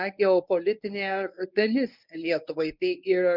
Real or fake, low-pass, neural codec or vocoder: fake; 5.4 kHz; codec, 24 kHz, 0.9 kbps, WavTokenizer, medium speech release version 1